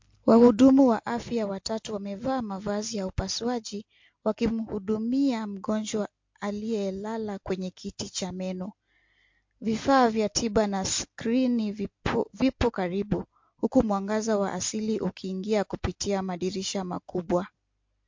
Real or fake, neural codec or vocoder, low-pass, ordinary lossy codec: real; none; 7.2 kHz; MP3, 48 kbps